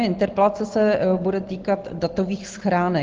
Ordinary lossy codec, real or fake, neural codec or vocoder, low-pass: Opus, 16 kbps; real; none; 7.2 kHz